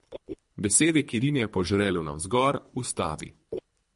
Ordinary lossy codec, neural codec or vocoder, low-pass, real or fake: MP3, 48 kbps; codec, 24 kHz, 3 kbps, HILCodec; 10.8 kHz; fake